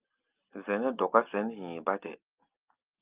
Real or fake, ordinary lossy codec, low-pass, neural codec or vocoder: real; Opus, 24 kbps; 3.6 kHz; none